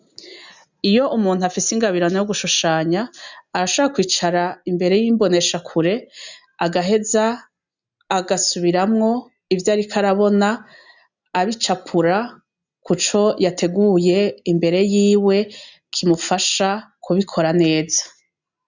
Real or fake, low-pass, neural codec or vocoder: real; 7.2 kHz; none